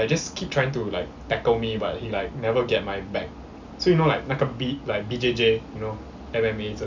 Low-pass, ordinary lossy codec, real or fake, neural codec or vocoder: 7.2 kHz; Opus, 64 kbps; real; none